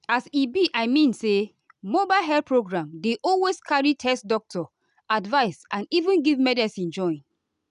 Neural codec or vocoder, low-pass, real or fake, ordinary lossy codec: none; 10.8 kHz; real; none